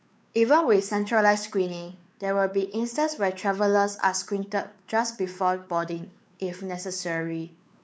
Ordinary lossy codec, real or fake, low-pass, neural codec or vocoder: none; fake; none; codec, 16 kHz, 4 kbps, X-Codec, WavLM features, trained on Multilingual LibriSpeech